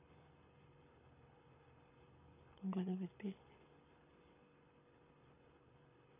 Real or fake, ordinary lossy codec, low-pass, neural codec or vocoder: fake; none; 3.6 kHz; codec, 24 kHz, 6 kbps, HILCodec